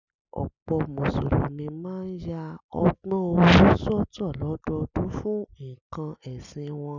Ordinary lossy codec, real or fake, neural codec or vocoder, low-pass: none; real; none; 7.2 kHz